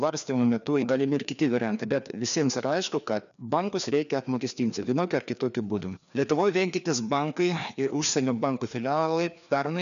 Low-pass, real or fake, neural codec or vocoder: 7.2 kHz; fake; codec, 16 kHz, 2 kbps, FreqCodec, larger model